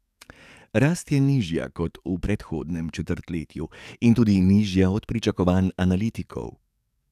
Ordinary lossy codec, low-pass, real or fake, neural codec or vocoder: none; 14.4 kHz; fake; codec, 44.1 kHz, 7.8 kbps, DAC